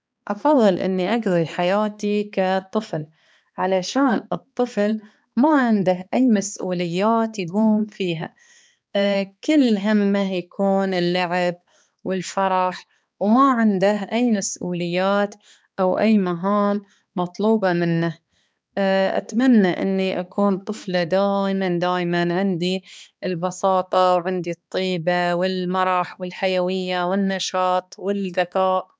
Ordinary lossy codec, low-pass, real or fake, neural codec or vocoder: none; none; fake; codec, 16 kHz, 2 kbps, X-Codec, HuBERT features, trained on balanced general audio